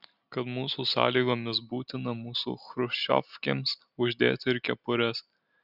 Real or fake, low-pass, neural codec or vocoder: real; 5.4 kHz; none